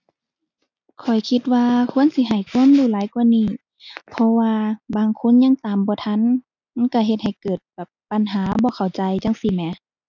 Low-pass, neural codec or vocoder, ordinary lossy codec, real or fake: 7.2 kHz; none; none; real